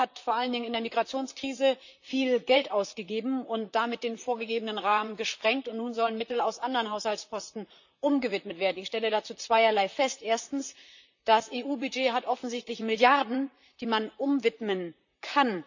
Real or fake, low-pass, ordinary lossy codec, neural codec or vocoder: fake; 7.2 kHz; none; vocoder, 44.1 kHz, 128 mel bands, Pupu-Vocoder